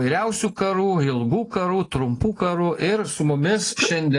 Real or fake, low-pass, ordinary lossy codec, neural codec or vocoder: real; 10.8 kHz; AAC, 32 kbps; none